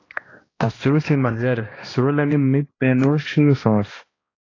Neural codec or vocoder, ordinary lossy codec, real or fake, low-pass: codec, 16 kHz, 1 kbps, X-Codec, HuBERT features, trained on balanced general audio; AAC, 32 kbps; fake; 7.2 kHz